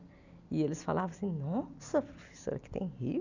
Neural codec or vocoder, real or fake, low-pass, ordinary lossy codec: none; real; 7.2 kHz; none